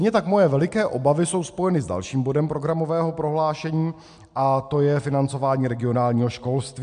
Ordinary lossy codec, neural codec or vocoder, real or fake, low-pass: MP3, 64 kbps; none; real; 9.9 kHz